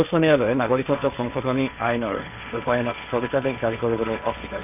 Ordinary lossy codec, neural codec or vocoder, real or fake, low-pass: none; codec, 16 kHz, 1.1 kbps, Voila-Tokenizer; fake; 3.6 kHz